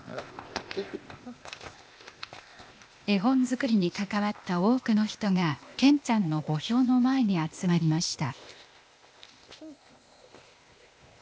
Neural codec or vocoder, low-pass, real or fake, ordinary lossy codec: codec, 16 kHz, 0.8 kbps, ZipCodec; none; fake; none